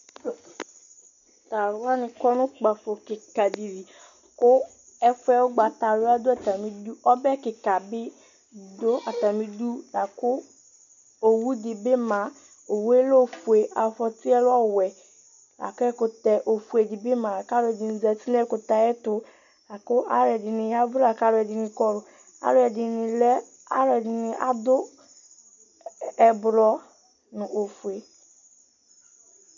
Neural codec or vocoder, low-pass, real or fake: none; 7.2 kHz; real